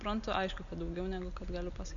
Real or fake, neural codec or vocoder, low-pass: real; none; 7.2 kHz